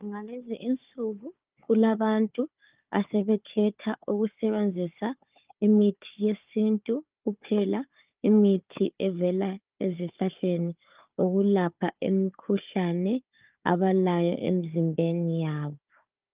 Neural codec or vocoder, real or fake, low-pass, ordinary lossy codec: codec, 16 kHz, 4 kbps, FunCodec, trained on Chinese and English, 50 frames a second; fake; 3.6 kHz; Opus, 32 kbps